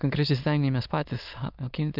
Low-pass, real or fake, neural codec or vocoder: 5.4 kHz; fake; autoencoder, 22.05 kHz, a latent of 192 numbers a frame, VITS, trained on many speakers